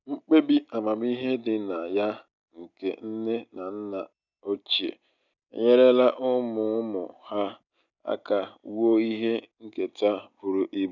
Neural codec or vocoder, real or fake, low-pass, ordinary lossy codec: none; real; 7.2 kHz; none